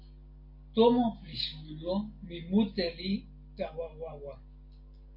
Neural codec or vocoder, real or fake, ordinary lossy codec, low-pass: none; real; MP3, 32 kbps; 5.4 kHz